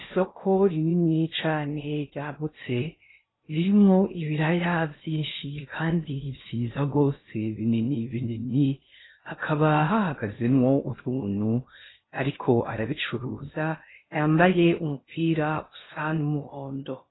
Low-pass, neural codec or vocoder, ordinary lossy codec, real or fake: 7.2 kHz; codec, 16 kHz in and 24 kHz out, 0.6 kbps, FocalCodec, streaming, 2048 codes; AAC, 16 kbps; fake